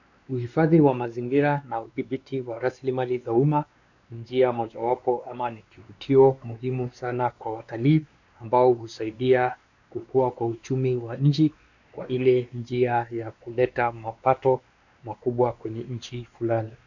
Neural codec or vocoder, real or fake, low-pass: codec, 16 kHz, 2 kbps, X-Codec, WavLM features, trained on Multilingual LibriSpeech; fake; 7.2 kHz